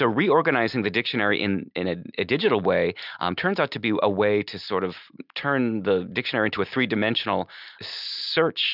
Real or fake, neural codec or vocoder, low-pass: real; none; 5.4 kHz